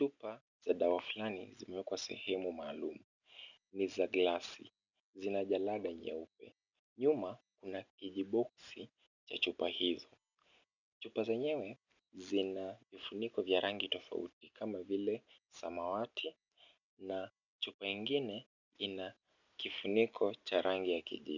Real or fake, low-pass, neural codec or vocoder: real; 7.2 kHz; none